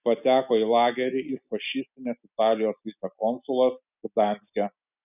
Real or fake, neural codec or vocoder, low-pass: real; none; 3.6 kHz